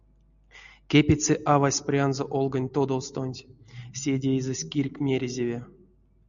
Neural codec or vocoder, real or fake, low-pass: none; real; 7.2 kHz